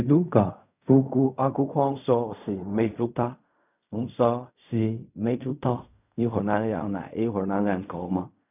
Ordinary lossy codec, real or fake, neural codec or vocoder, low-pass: none; fake; codec, 16 kHz in and 24 kHz out, 0.4 kbps, LongCat-Audio-Codec, fine tuned four codebook decoder; 3.6 kHz